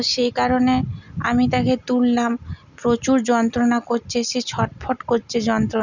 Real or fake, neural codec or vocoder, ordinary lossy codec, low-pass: real; none; none; 7.2 kHz